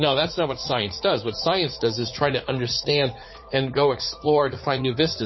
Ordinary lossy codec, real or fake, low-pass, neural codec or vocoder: MP3, 24 kbps; fake; 7.2 kHz; vocoder, 44.1 kHz, 80 mel bands, Vocos